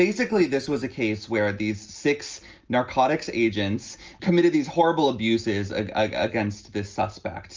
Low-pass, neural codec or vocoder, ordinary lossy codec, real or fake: 7.2 kHz; none; Opus, 24 kbps; real